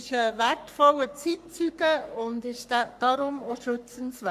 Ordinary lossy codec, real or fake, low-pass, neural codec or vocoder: Opus, 64 kbps; fake; 14.4 kHz; codec, 44.1 kHz, 3.4 kbps, Pupu-Codec